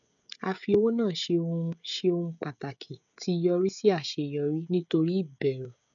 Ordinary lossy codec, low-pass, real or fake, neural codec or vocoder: none; 7.2 kHz; fake; codec, 16 kHz, 16 kbps, FreqCodec, smaller model